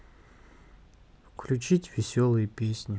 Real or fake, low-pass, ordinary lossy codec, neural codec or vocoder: real; none; none; none